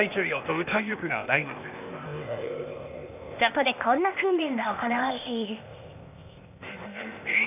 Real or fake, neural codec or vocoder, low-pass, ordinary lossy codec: fake; codec, 16 kHz, 0.8 kbps, ZipCodec; 3.6 kHz; none